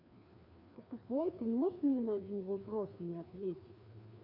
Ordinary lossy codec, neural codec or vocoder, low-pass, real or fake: none; codec, 16 kHz, 2 kbps, FreqCodec, larger model; 5.4 kHz; fake